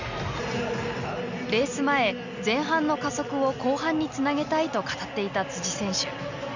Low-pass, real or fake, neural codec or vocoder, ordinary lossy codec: 7.2 kHz; real; none; none